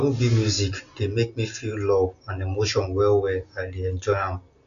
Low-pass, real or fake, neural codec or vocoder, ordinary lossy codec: 7.2 kHz; real; none; none